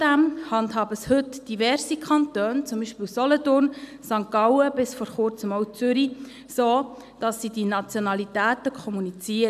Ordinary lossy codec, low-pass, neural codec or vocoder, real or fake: none; 14.4 kHz; none; real